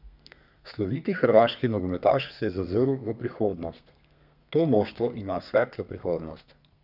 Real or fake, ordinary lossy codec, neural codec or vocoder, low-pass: fake; none; codec, 32 kHz, 1.9 kbps, SNAC; 5.4 kHz